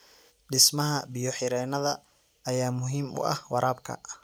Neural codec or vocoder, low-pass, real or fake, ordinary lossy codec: none; none; real; none